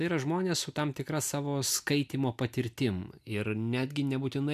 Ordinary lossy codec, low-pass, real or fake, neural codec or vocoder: MP3, 96 kbps; 14.4 kHz; real; none